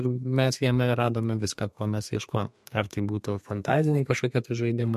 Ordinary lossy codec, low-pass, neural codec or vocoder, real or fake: MP3, 64 kbps; 14.4 kHz; codec, 32 kHz, 1.9 kbps, SNAC; fake